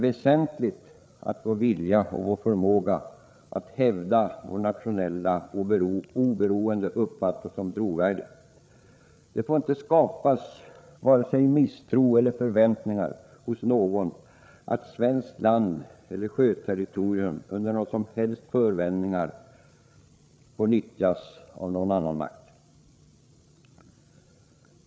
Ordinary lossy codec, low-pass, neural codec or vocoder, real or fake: none; none; codec, 16 kHz, 8 kbps, FreqCodec, larger model; fake